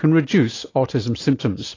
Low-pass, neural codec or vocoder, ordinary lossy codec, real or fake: 7.2 kHz; none; AAC, 32 kbps; real